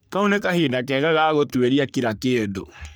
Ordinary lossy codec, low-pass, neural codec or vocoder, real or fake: none; none; codec, 44.1 kHz, 3.4 kbps, Pupu-Codec; fake